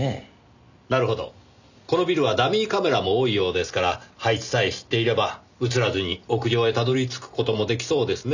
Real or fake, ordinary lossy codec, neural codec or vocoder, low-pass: real; none; none; 7.2 kHz